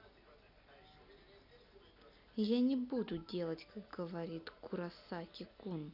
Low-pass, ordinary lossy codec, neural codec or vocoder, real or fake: 5.4 kHz; none; none; real